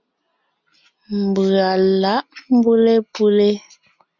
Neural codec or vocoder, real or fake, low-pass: none; real; 7.2 kHz